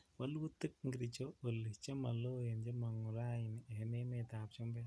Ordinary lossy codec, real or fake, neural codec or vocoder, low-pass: none; real; none; none